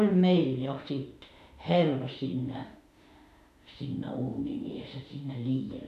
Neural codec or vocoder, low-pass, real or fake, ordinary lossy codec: autoencoder, 48 kHz, 32 numbers a frame, DAC-VAE, trained on Japanese speech; 14.4 kHz; fake; none